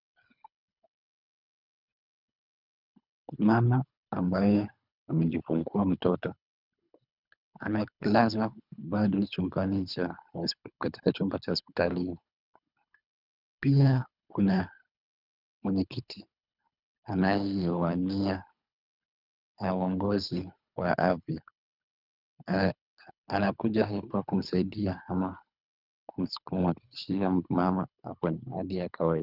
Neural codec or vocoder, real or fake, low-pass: codec, 24 kHz, 3 kbps, HILCodec; fake; 5.4 kHz